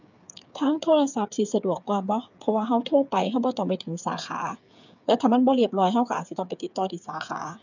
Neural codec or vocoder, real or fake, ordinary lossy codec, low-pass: codec, 16 kHz, 8 kbps, FreqCodec, smaller model; fake; none; 7.2 kHz